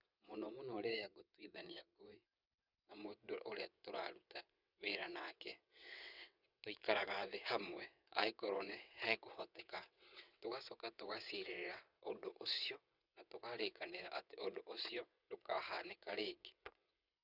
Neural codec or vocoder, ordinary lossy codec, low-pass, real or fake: vocoder, 22.05 kHz, 80 mel bands, WaveNeXt; none; 5.4 kHz; fake